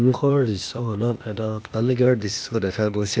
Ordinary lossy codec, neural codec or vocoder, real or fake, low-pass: none; codec, 16 kHz, 0.8 kbps, ZipCodec; fake; none